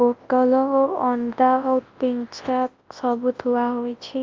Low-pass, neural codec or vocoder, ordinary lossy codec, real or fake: 7.2 kHz; codec, 24 kHz, 0.9 kbps, WavTokenizer, large speech release; Opus, 32 kbps; fake